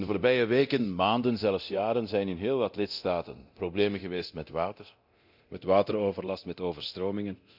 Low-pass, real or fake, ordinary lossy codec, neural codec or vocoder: 5.4 kHz; fake; none; codec, 24 kHz, 0.9 kbps, DualCodec